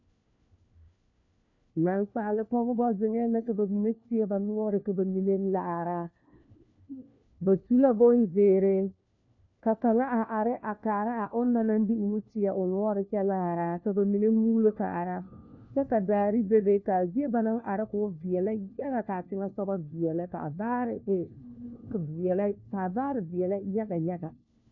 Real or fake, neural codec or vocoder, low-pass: fake; codec, 16 kHz, 1 kbps, FunCodec, trained on LibriTTS, 50 frames a second; 7.2 kHz